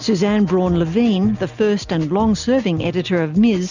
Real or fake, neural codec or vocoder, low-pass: real; none; 7.2 kHz